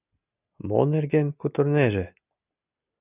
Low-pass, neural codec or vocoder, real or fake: 3.6 kHz; none; real